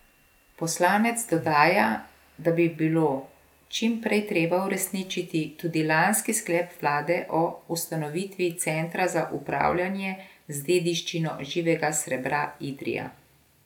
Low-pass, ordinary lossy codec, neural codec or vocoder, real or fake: 19.8 kHz; none; none; real